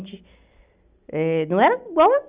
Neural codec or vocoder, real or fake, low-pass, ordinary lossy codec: none; real; 3.6 kHz; Opus, 64 kbps